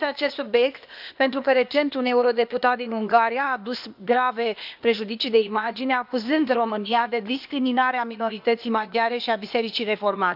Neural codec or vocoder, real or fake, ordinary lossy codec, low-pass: codec, 16 kHz, 0.8 kbps, ZipCodec; fake; none; 5.4 kHz